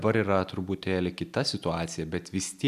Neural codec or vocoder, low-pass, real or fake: none; 14.4 kHz; real